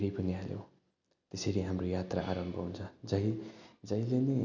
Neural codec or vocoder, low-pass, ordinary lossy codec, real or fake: none; 7.2 kHz; none; real